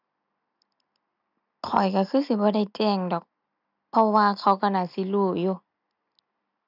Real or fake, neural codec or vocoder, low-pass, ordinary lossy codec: real; none; 5.4 kHz; none